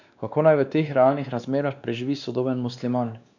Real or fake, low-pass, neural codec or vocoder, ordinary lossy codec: fake; 7.2 kHz; codec, 16 kHz, 2 kbps, X-Codec, WavLM features, trained on Multilingual LibriSpeech; none